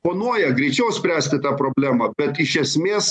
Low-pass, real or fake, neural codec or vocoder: 10.8 kHz; real; none